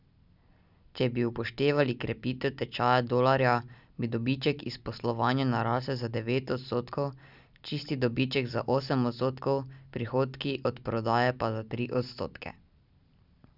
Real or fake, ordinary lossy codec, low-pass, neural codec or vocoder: real; none; 5.4 kHz; none